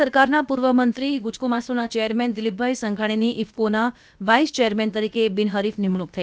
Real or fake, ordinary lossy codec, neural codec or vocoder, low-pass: fake; none; codec, 16 kHz, about 1 kbps, DyCAST, with the encoder's durations; none